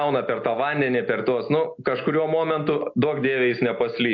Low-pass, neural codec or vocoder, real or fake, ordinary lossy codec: 7.2 kHz; none; real; AAC, 48 kbps